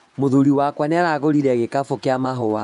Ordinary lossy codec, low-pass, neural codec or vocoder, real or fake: MP3, 96 kbps; 10.8 kHz; vocoder, 24 kHz, 100 mel bands, Vocos; fake